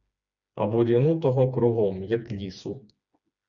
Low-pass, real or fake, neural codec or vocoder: 7.2 kHz; fake; codec, 16 kHz, 2 kbps, FreqCodec, smaller model